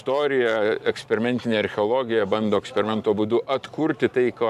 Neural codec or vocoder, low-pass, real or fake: vocoder, 44.1 kHz, 128 mel bands every 512 samples, BigVGAN v2; 14.4 kHz; fake